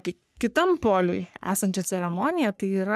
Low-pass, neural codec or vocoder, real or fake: 14.4 kHz; codec, 44.1 kHz, 3.4 kbps, Pupu-Codec; fake